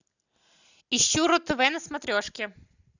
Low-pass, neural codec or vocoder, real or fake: 7.2 kHz; none; real